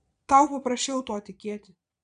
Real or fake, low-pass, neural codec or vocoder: fake; 9.9 kHz; vocoder, 22.05 kHz, 80 mel bands, Vocos